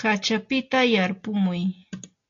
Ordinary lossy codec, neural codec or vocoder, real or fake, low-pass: AAC, 48 kbps; none; real; 7.2 kHz